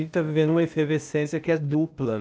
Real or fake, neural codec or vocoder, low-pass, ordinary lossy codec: fake; codec, 16 kHz, 0.8 kbps, ZipCodec; none; none